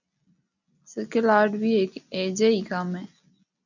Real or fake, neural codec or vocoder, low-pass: real; none; 7.2 kHz